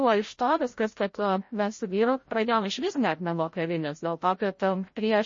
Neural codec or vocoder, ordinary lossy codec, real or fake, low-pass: codec, 16 kHz, 0.5 kbps, FreqCodec, larger model; MP3, 32 kbps; fake; 7.2 kHz